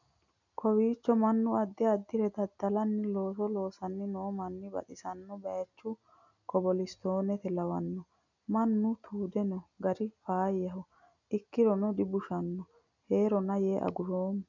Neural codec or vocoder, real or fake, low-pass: none; real; 7.2 kHz